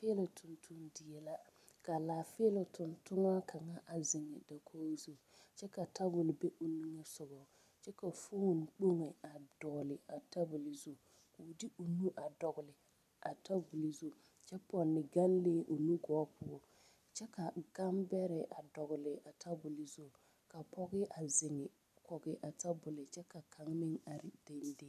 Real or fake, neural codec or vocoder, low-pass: real; none; 14.4 kHz